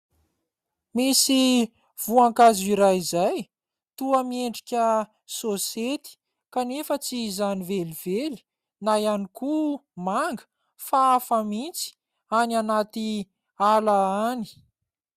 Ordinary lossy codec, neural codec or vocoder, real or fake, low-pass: Opus, 64 kbps; none; real; 14.4 kHz